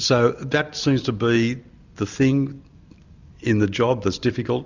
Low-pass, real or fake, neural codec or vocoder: 7.2 kHz; real; none